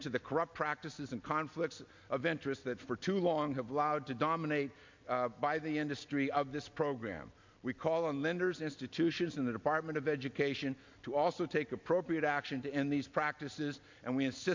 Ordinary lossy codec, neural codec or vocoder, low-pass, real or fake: MP3, 48 kbps; none; 7.2 kHz; real